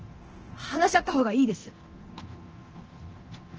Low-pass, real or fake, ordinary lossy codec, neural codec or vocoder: 7.2 kHz; fake; Opus, 16 kbps; codec, 24 kHz, 0.9 kbps, DualCodec